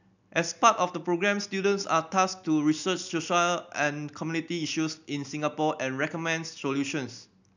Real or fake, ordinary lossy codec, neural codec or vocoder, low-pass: real; none; none; 7.2 kHz